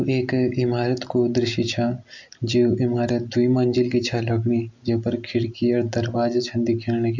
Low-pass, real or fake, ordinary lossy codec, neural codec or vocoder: 7.2 kHz; real; MP3, 64 kbps; none